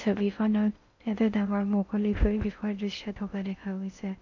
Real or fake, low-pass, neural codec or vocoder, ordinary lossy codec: fake; 7.2 kHz; codec, 16 kHz in and 24 kHz out, 0.6 kbps, FocalCodec, streaming, 4096 codes; none